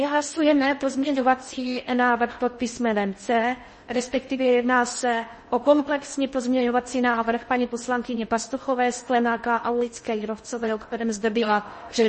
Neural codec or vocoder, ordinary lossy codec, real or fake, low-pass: codec, 16 kHz in and 24 kHz out, 0.6 kbps, FocalCodec, streaming, 4096 codes; MP3, 32 kbps; fake; 10.8 kHz